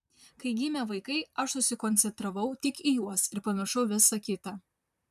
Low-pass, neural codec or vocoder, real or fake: 14.4 kHz; vocoder, 44.1 kHz, 128 mel bands, Pupu-Vocoder; fake